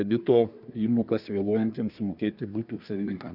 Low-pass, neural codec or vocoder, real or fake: 5.4 kHz; codec, 24 kHz, 1 kbps, SNAC; fake